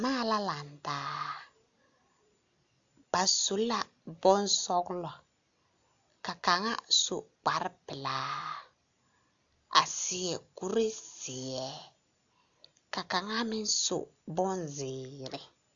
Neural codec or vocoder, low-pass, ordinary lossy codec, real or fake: none; 7.2 kHz; AAC, 64 kbps; real